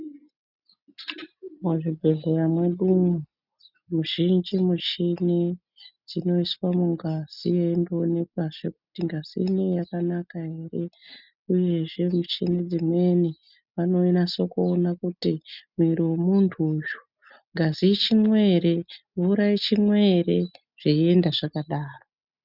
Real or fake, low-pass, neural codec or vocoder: real; 5.4 kHz; none